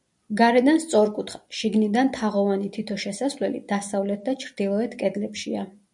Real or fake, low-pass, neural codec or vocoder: real; 10.8 kHz; none